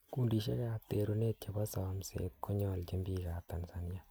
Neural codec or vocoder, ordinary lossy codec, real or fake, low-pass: none; none; real; none